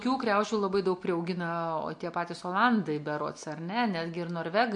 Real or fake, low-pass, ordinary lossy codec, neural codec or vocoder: real; 10.8 kHz; MP3, 48 kbps; none